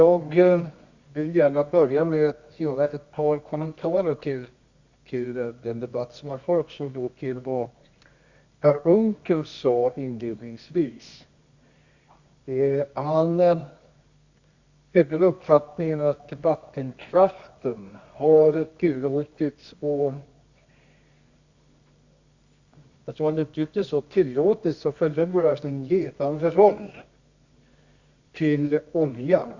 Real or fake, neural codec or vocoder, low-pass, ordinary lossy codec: fake; codec, 24 kHz, 0.9 kbps, WavTokenizer, medium music audio release; 7.2 kHz; Opus, 64 kbps